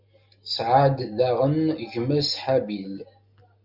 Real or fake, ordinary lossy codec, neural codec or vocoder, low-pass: real; Opus, 64 kbps; none; 5.4 kHz